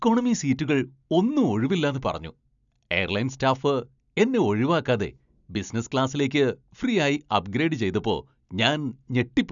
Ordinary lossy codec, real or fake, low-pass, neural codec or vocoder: none; real; 7.2 kHz; none